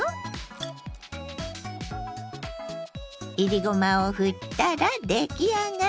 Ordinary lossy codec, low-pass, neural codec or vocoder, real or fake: none; none; none; real